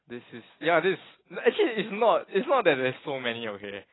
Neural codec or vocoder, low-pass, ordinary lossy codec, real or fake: none; 7.2 kHz; AAC, 16 kbps; real